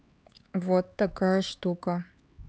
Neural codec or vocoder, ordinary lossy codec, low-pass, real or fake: codec, 16 kHz, 4 kbps, X-Codec, HuBERT features, trained on LibriSpeech; none; none; fake